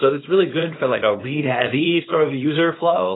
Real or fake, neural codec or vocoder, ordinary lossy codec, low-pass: fake; codec, 24 kHz, 0.9 kbps, WavTokenizer, small release; AAC, 16 kbps; 7.2 kHz